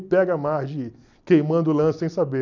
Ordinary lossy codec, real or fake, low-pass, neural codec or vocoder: none; real; 7.2 kHz; none